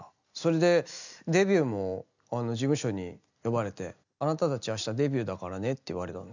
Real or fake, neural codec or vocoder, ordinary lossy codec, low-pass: real; none; none; 7.2 kHz